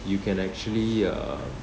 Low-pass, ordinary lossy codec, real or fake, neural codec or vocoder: none; none; real; none